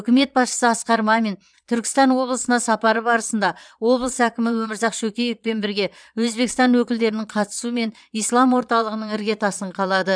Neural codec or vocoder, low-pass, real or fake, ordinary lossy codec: vocoder, 22.05 kHz, 80 mel bands, Vocos; 9.9 kHz; fake; none